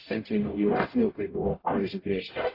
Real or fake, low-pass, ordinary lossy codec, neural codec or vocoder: fake; 5.4 kHz; AAC, 24 kbps; codec, 44.1 kHz, 0.9 kbps, DAC